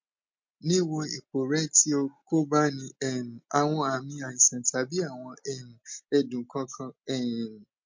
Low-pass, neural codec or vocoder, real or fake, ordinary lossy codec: 7.2 kHz; none; real; MP3, 48 kbps